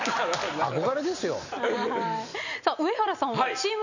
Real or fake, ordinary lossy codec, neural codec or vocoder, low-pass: real; none; none; 7.2 kHz